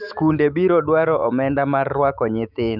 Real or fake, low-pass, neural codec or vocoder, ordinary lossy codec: fake; 5.4 kHz; autoencoder, 48 kHz, 128 numbers a frame, DAC-VAE, trained on Japanese speech; none